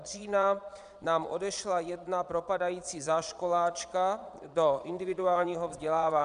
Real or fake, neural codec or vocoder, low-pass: fake; vocoder, 22.05 kHz, 80 mel bands, Vocos; 9.9 kHz